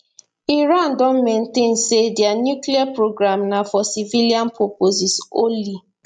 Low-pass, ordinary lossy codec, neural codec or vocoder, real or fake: 9.9 kHz; none; none; real